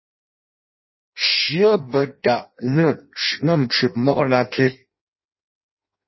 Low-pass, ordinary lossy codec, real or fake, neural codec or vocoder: 7.2 kHz; MP3, 24 kbps; fake; codec, 16 kHz in and 24 kHz out, 0.6 kbps, FireRedTTS-2 codec